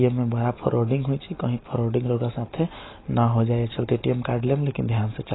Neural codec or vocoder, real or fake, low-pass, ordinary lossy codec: none; real; 7.2 kHz; AAC, 16 kbps